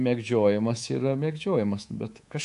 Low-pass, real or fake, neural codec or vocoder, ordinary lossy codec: 10.8 kHz; fake; codec, 24 kHz, 3.1 kbps, DualCodec; AAC, 64 kbps